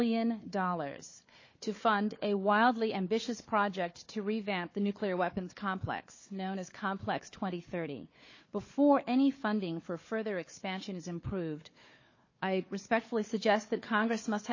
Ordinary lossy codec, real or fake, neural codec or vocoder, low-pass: MP3, 32 kbps; fake; codec, 16 kHz, 4 kbps, FunCodec, trained on Chinese and English, 50 frames a second; 7.2 kHz